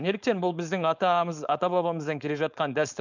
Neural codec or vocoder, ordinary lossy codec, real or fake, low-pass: codec, 16 kHz, 4.8 kbps, FACodec; none; fake; 7.2 kHz